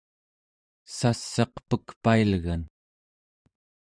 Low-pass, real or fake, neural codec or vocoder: 9.9 kHz; fake; vocoder, 44.1 kHz, 128 mel bands every 512 samples, BigVGAN v2